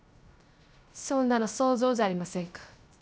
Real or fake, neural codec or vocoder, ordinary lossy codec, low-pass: fake; codec, 16 kHz, 0.3 kbps, FocalCodec; none; none